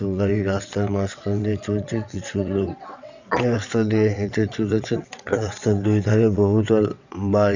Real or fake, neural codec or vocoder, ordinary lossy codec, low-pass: fake; vocoder, 22.05 kHz, 80 mel bands, WaveNeXt; none; 7.2 kHz